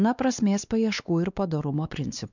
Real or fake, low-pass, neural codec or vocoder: fake; 7.2 kHz; codec, 16 kHz, 2 kbps, X-Codec, WavLM features, trained on Multilingual LibriSpeech